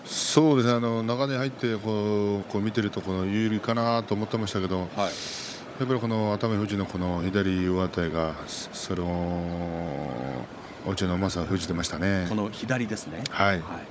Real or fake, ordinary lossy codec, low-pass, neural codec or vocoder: fake; none; none; codec, 16 kHz, 16 kbps, FunCodec, trained on Chinese and English, 50 frames a second